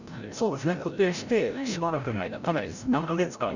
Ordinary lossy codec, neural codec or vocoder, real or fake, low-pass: none; codec, 16 kHz, 0.5 kbps, FreqCodec, larger model; fake; 7.2 kHz